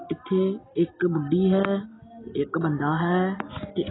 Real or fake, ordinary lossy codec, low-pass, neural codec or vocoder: real; AAC, 16 kbps; 7.2 kHz; none